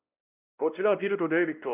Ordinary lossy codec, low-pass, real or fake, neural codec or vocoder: none; 3.6 kHz; fake; codec, 16 kHz, 1 kbps, X-Codec, WavLM features, trained on Multilingual LibriSpeech